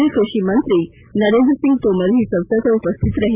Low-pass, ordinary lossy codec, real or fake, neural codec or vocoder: 3.6 kHz; AAC, 32 kbps; real; none